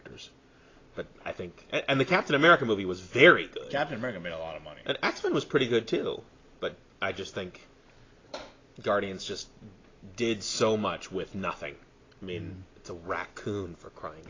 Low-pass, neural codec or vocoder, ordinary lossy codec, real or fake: 7.2 kHz; none; AAC, 32 kbps; real